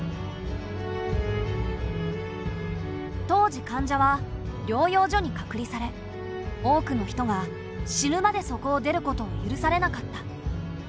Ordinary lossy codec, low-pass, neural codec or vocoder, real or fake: none; none; none; real